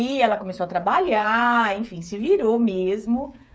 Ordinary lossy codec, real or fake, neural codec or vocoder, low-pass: none; fake; codec, 16 kHz, 8 kbps, FreqCodec, smaller model; none